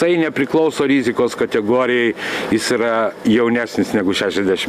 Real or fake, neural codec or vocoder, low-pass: real; none; 14.4 kHz